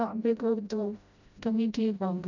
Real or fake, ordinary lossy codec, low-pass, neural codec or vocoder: fake; none; 7.2 kHz; codec, 16 kHz, 0.5 kbps, FreqCodec, smaller model